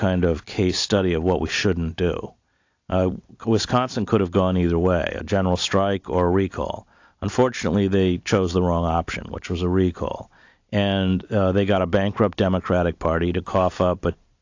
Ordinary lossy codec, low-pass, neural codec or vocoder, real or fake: AAC, 48 kbps; 7.2 kHz; none; real